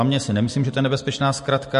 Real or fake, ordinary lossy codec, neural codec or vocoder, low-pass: real; MP3, 48 kbps; none; 10.8 kHz